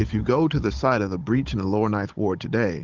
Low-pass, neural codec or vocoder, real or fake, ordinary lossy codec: 7.2 kHz; codec, 16 kHz, 16 kbps, FunCodec, trained on Chinese and English, 50 frames a second; fake; Opus, 16 kbps